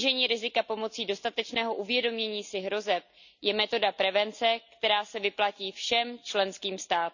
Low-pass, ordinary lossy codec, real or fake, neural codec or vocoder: 7.2 kHz; none; real; none